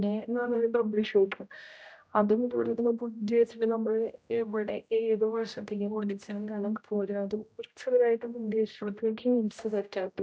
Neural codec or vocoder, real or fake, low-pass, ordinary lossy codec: codec, 16 kHz, 0.5 kbps, X-Codec, HuBERT features, trained on general audio; fake; none; none